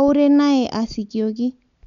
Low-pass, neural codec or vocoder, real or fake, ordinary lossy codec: 7.2 kHz; none; real; none